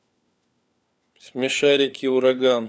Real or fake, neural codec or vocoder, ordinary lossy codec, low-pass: fake; codec, 16 kHz, 4 kbps, FunCodec, trained on LibriTTS, 50 frames a second; none; none